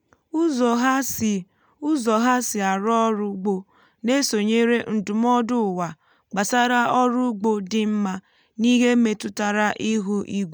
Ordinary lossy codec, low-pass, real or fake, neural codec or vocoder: none; none; real; none